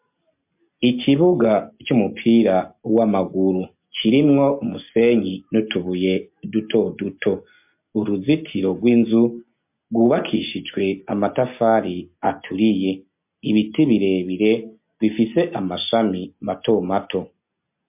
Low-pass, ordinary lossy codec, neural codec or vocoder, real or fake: 3.6 kHz; MP3, 32 kbps; none; real